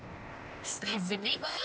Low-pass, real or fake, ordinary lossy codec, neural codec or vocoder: none; fake; none; codec, 16 kHz, 0.8 kbps, ZipCodec